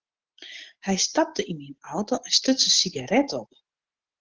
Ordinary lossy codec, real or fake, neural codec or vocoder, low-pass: Opus, 16 kbps; real; none; 7.2 kHz